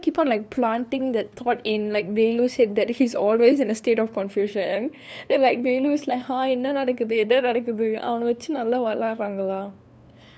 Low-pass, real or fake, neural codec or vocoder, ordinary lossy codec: none; fake; codec, 16 kHz, 2 kbps, FunCodec, trained on LibriTTS, 25 frames a second; none